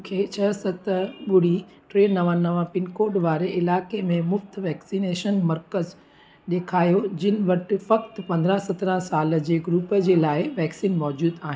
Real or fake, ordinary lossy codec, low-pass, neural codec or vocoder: real; none; none; none